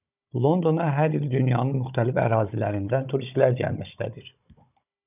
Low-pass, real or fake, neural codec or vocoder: 3.6 kHz; fake; codec, 16 kHz, 16 kbps, FunCodec, trained on Chinese and English, 50 frames a second